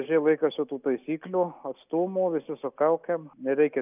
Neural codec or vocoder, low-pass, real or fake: none; 3.6 kHz; real